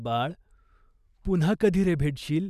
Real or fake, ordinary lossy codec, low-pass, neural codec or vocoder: real; none; 14.4 kHz; none